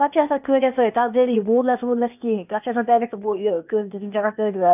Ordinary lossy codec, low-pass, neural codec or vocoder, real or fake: none; 3.6 kHz; codec, 16 kHz, 0.8 kbps, ZipCodec; fake